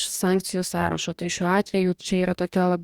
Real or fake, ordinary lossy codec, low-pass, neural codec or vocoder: fake; Opus, 64 kbps; 19.8 kHz; codec, 44.1 kHz, 2.6 kbps, DAC